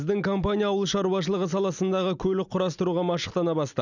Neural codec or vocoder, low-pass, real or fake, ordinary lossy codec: none; 7.2 kHz; real; none